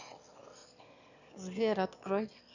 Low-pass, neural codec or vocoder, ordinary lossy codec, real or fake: 7.2 kHz; autoencoder, 22.05 kHz, a latent of 192 numbers a frame, VITS, trained on one speaker; none; fake